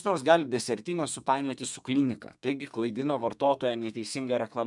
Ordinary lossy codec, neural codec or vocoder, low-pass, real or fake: MP3, 96 kbps; codec, 32 kHz, 1.9 kbps, SNAC; 10.8 kHz; fake